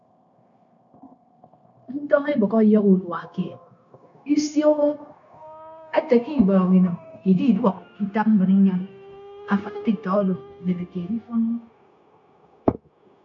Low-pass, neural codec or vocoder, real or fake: 7.2 kHz; codec, 16 kHz, 0.9 kbps, LongCat-Audio-Codec; fake